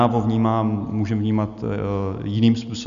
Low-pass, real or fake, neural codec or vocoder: 7.2 kHz; real; none